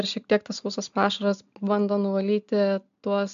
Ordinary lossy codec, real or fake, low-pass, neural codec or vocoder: AAC, 96 kbps; real; 7.2 kHz; none